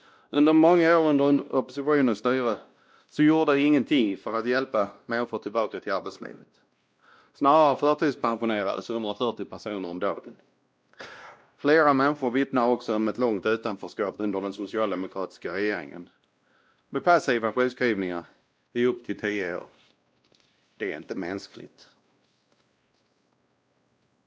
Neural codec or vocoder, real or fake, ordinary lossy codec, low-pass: codec, 16 kHz, 1 kbps, X-Codec, WavLM features, trained on Multilingual LibriSpeech; fake; none; none